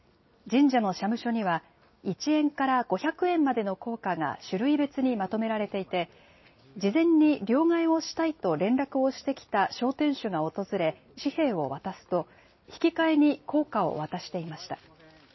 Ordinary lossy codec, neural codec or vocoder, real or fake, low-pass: MP3, 24 kbps; none; real; 7.2 kHz